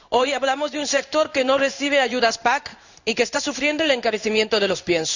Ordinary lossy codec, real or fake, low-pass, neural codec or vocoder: none; fake; 7.2 kHz; codec, 16 kHz in and 24 kHz out, 1 kbps, XY-Tokenizer